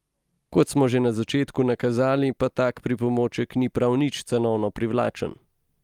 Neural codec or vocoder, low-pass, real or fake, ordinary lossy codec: none; 19.8 kHz; real; Opus, 32 kbps